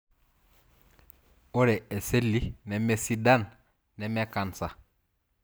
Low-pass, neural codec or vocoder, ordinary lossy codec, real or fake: none; none; none; real